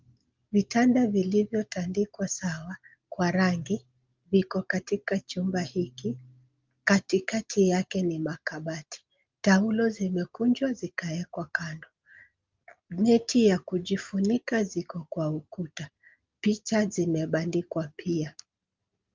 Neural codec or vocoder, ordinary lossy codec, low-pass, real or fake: none; Opus, 32 kbps; 7.2 kHz; real